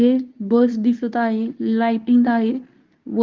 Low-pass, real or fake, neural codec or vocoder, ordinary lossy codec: 7.2 kHz; fake; codec, 24 kHz, 0.9 kbps, WavTokenizer, medium speech release version 2; Opus, 24 kbps